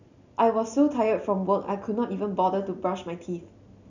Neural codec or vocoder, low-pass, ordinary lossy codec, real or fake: none; 7.2 kHz; none; real